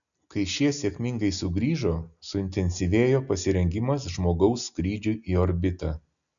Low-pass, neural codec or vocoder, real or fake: 7.2 kHz; none; real